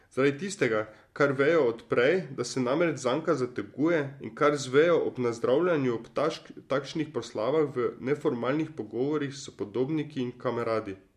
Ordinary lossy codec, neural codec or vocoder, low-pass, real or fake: MP3, 64 kbps; none; 14.4 kHz; real